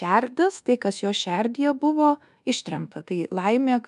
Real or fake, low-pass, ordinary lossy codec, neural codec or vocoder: fake; 10.8 kHz; AAC, 96 kbps; codec, 24 kHz, 1.2 kbps, DualCodec